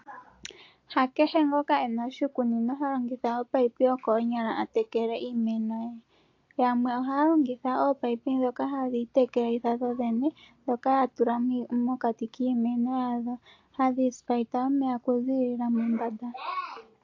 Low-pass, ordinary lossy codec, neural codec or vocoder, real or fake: 7.2 kHz; AAC, 48 kbps; none; real